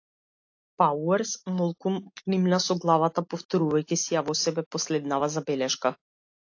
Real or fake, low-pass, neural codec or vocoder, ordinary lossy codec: real; 7.2 kHz; none; AAC, 48 kbps